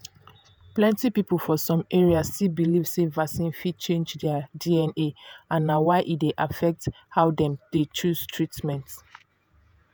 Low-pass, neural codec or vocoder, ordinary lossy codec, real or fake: none; vocoder, 48 kHz, 128 mel bands, Vocos; none; fake